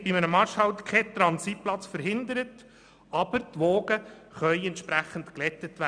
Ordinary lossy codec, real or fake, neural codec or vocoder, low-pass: none; real; none; 9.9 kHz